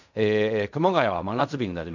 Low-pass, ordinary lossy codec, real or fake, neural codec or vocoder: 7.2 kHz; none; fake; codec, 16 kHz in and 24 kHz out, 0.4 kbps, LongCat-Audio-Codec, fine tuned four codebook decoder